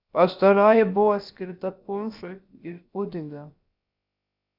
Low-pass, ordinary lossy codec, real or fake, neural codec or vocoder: 5.4 kHz; Opus, 64 kbps; fake; codec, 16 kHz, about 1 kbps, DyCAST, with the encoder's durations